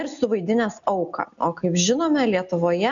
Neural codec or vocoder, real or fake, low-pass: none; real; 7.2 kHz